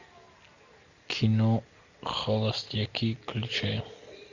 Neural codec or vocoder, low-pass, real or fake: none; 7.2 kHz; real